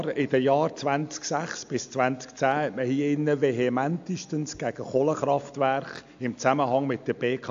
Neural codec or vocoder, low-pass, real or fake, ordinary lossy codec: none; 7.2 kHz; real; AAC, 64 kbps